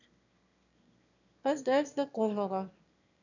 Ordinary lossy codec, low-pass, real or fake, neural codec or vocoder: none; 7.2 kHz; fake; autoencoder, 22.05 kHz, a latent of 192 numbers a frame, VITS, trained on one speaker